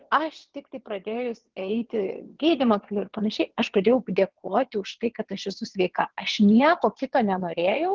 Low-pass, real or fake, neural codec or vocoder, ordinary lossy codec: 7.2 kHz; fake; vocoder, 22.05 kHz, 80 mel bands, WaveNeXt; Opus, 16 kbps